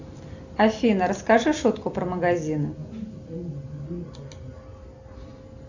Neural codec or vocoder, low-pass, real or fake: none; 7.2 kHz; real